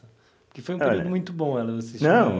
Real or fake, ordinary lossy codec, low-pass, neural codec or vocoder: real; none; none; none